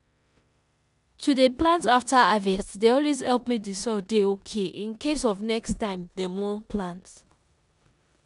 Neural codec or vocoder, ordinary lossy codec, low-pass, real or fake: codec, 16 kHz in and 24 kHz out, 0.9 kbps, LongCat-Audio-Codec, four codebook decoder; none; 10.8 kHz; fake